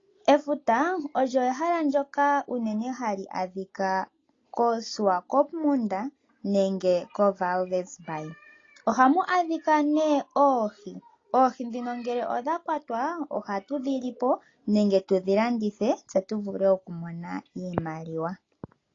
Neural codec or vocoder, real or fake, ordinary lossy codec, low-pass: none; real; AAC, 32 kbps; 7.2 kHz